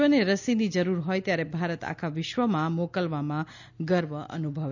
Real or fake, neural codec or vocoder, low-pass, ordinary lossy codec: real; none; 7.2 kHz; none